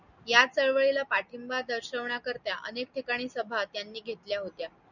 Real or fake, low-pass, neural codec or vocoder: real; 7.2 kHz; none